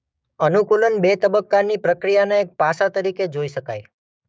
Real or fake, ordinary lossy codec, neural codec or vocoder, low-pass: fake; none; codec, 16 kHz, 6 kbps, DAC; none